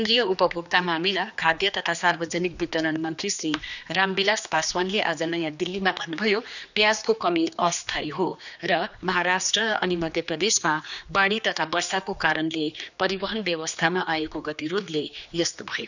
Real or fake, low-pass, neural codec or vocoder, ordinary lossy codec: fake; 7.2 kHz; codec, 16 kHz, 2 kbps, X-Codec, HuBERT features, trained on general audio; none